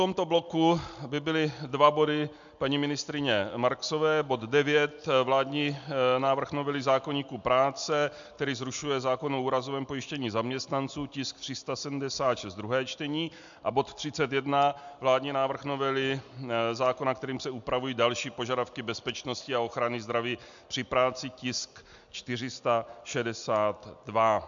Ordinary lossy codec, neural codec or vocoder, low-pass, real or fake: MP3, 64 kbps; none; 7.2 kHz; real